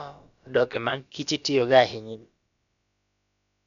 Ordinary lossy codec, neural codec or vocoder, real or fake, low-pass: none; codec, 16 kHz, about 1 kbps, DyCAST, with the encoder's durations; fake; 7.2 kHz